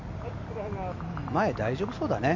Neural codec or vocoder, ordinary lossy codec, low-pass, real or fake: none; MP3, 64 kbps; 7.2 kHz; real